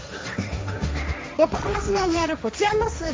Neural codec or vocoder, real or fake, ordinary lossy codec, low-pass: codec, 16 kHz, 1.1 kbps, Voila-Tokenizer; fake; none; none